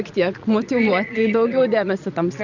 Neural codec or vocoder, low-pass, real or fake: vocoder, 22.05 kHz, 80 mel bands, Vocos; 7.2 kHz; fake